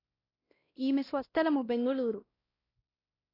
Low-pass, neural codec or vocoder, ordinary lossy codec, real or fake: 5.4 kHz; codec, 16 kHz, 1 kbps, X-Codec, WavLM features, trained on Multilingual LibriSpeech; AAC, 24 kbps; fake